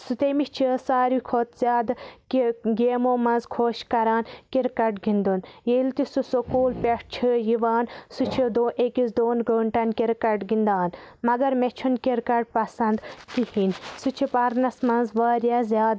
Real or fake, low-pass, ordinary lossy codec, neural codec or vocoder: real; none; none; none